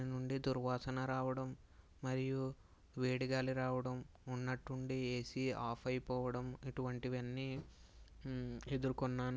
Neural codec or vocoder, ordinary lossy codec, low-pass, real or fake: none; none; none; real